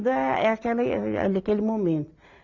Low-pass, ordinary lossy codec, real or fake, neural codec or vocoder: 7.2 kHz; Opus, 64 kbps; real; none